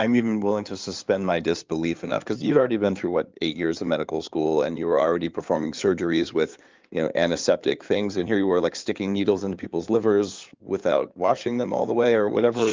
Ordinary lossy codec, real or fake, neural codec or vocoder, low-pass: Opus, 24 kbps; fake; codec, 16 kHz in and 24 kHz out, 2.2 kbps, FireRedTTS-2 codec; 7.2 kHz